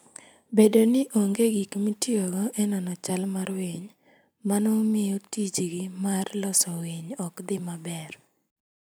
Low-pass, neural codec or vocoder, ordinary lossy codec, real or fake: none; none; none; real